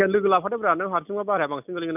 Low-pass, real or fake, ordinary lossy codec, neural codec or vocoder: 3.6 kHz; real; none; none